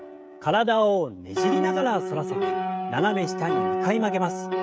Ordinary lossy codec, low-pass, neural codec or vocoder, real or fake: none; none; codec, 16 kHz, 16 kbps, FreqCodec, smaller model; fake